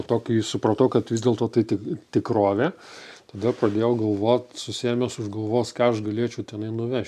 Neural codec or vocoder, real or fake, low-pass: none; real; 14.4 kHz